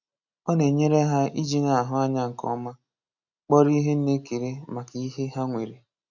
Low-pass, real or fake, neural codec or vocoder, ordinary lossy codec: 7.2 kHz; real; none; none